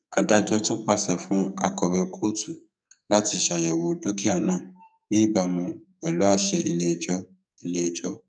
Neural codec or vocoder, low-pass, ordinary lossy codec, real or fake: codec, 44.1 kHz, 2.6 kbps, SNAC; 9.9 kHz; none; fake